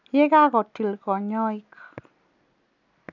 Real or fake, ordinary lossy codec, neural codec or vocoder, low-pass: real; none; none; 7.2 kHz